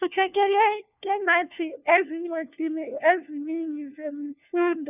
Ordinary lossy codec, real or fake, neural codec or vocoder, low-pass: none; fake; codec, 16 kHz, 1 kbps, FunCodec, trained on LibriTTS, 50 frames a second; 3.6 kHz